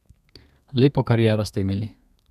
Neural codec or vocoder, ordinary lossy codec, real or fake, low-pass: codec, 32 kHz, 1.9 kbps, SNAC; none; fake; 14.4 kHz